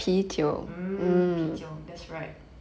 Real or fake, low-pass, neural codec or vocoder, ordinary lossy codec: real; none; none; none